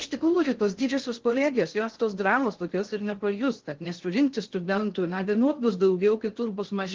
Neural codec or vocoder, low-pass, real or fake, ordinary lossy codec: codec, 16 kHz in and 24 kHz out, 0.6 kbps, FocalCodec, streaming, 4096 codes; 7.2 kHz; fake; Opus, 16 kbps